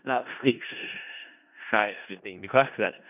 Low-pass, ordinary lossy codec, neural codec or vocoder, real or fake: 3.6 kHz; none; codec, 16 kHz in and 24 kHz out, 0.4 kbps, LongCat-Audio-Codec, four codebook decoder; fake